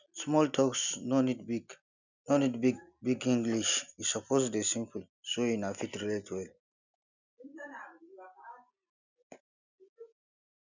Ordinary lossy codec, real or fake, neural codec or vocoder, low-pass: none; real; none; 7.2 kHz